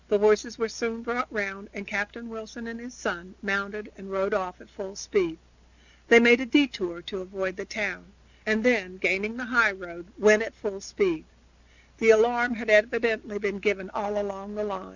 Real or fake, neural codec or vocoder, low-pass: real; none; 7.2 kHz